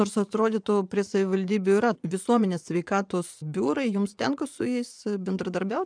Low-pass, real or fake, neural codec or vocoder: 9.9 kHz; fake; vocoder, 24 kHz, 100 mel bands, Vocos